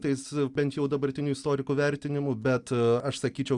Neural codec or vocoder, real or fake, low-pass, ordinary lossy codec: none; real; 10.8 kHz; Opus, 64 kbps